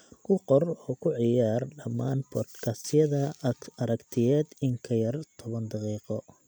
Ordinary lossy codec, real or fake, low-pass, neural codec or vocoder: none; real; none; none